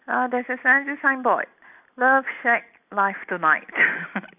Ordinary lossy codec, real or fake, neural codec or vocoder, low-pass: none; real; none; 3.6 kHz